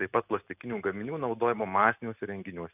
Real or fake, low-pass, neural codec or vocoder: real; 3.6 kHz; none